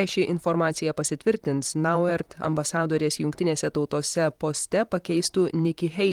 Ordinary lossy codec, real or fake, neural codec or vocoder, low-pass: Opus, 24 kbps; fake; vocoder, 44.1 kHz, 128 mel bands, Pupu-Vocoder; 19.8 kHz